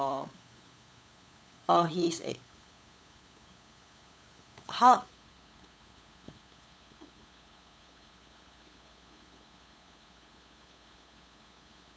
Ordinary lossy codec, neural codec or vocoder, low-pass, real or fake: none; codec, 16 kHz, 8 kbps, FunCodec, trained on LibriTTS, 25 frames a second; none; fake